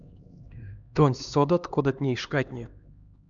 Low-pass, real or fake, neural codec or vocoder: 7.2 kHz; fake; codec, 16 kHz, 2 kbps, X-Codec, HuBERT features, trained on LibriSpeech